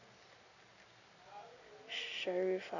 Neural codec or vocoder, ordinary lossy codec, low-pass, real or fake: none; none; 7.2 kHz; real